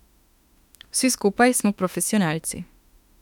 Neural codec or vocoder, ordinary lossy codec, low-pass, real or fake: autoencoder, 48 kHz, 32 numbers a frame, DAC-VAE, trained on Japanese speech; none; 19.8 kHz; fake